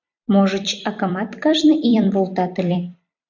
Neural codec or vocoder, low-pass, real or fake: vocoder, 44.1 kHz, 128 mel bands every 256 samples, BigVGAN v2; 7.2 kHz; fake